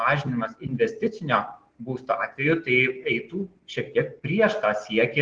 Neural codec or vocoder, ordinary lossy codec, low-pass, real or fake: none; Opus, 16 kbps; 9.9 kHz; real